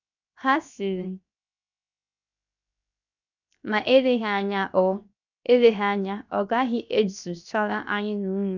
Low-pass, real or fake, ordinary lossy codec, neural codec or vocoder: 7.2 kHz; fake; none; codec, 16 kHz, 0.7 kbps, FocalCodec